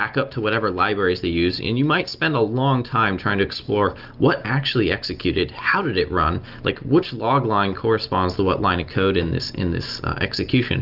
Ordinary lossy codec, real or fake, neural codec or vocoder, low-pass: Opus, 24 kbps; real; none; 5.4 kHz